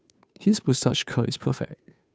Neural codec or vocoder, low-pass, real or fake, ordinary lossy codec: codec, 16 kHz, 2 kbps, FunCodec, trained on Chinese and English, 25 frames a second; none; fake; none